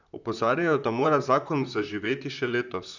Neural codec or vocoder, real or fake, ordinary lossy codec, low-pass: vocoder, 44.1 kHz, 128 mel bands, Pupu-Vocoder; fake; none; 7.2 kHz